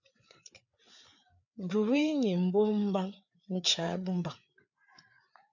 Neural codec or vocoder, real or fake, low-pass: codec, 16 kHz, 4 kbps, FreqCodec, larger model; fake; 7.2 kHz